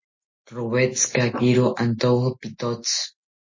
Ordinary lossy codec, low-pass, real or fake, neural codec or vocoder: MP3, 32 kbps; 7.2 kHz; real; none